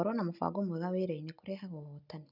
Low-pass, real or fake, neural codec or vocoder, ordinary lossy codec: 5.4 kHz; real; none; none